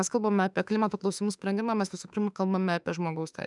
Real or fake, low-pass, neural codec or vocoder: fake; 10.8 kHz; autoencoder, 48 kHz, 32 numbers a frame, DAC-VAE, trained on Japanese speech